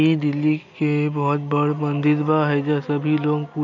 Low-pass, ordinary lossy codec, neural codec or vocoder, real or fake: 7.2 kHz; none; none; real